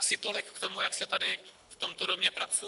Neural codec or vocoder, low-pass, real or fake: codec, 24 kHz, 3 kbps, HILCodec; 10.8 kHz; fake